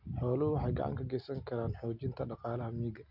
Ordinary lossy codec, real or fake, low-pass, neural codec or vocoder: none; real; 5.4 kHz; none